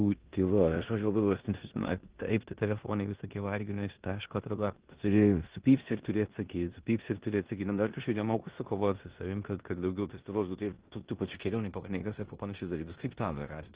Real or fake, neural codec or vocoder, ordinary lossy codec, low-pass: fake; codec, 16 kHz in and 24 kHz out, 0.9 kbps, LongCat-Audio-Codec, four codebook decoder; Opus, 16 kbps; 3.6 kHz